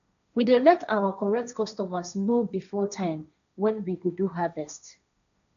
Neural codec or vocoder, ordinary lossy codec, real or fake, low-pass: codec, 16 kHz, 1.1 kbps, Voila-Tokenizer; none; fake; 7.2 kHz